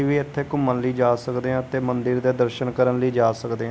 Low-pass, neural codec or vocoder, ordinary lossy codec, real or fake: none; none; none; real